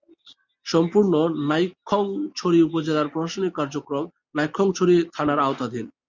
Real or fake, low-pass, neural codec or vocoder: real; 7.2 kHz; none